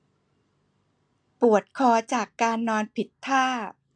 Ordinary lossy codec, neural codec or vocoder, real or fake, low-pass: AAC, 64 kbps; none; real; 9.9 kHz